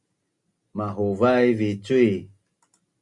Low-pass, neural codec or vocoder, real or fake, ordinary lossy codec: 10.8 kHz; none; real; Opus, 64 kbps